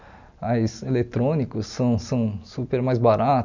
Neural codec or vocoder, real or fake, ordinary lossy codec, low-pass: none; real; none; 7.2 kHz